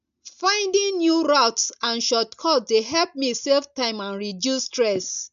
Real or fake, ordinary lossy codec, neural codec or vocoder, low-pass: real; none; none; 7.2 kHz